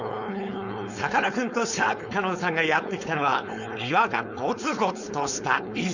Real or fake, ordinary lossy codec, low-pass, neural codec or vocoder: fake; none; 7.2 kHz; codec, 16 kHz, 4.8 kbps, FACodec